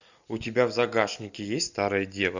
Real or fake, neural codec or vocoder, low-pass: real; none; 7.2 kHz